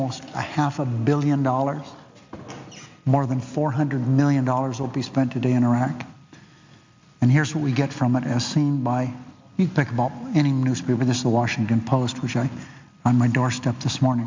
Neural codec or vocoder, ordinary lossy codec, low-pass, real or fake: none; MP3, 64 kbps; 7.2 kHz; real